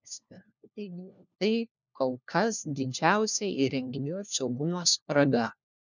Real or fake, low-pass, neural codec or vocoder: fake; 7.2 kHz; codec, 16 kHz, 1 kbps, FunCodec, trained on LibriTTS, 50 frames a second